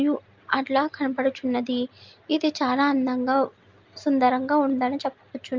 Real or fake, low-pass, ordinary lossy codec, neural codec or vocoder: real; 7.2 kHz; Opus, 24 kbps; none